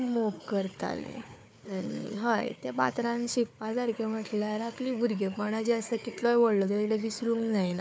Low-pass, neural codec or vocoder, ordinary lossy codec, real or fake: none; codec, 16 kHz, 4 kbps, FunCodec, trained on Chinese and English, 50 frames a second; none; fake